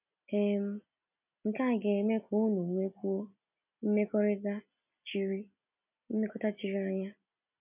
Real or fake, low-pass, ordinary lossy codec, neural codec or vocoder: real; 3.6 kHz; none; none